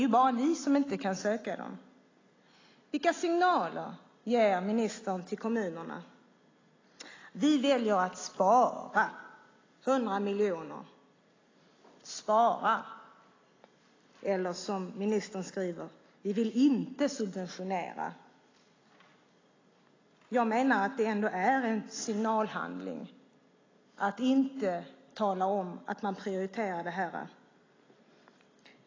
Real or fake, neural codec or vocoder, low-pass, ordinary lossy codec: real; none; 7.2 kHz; AAC, 32 kbps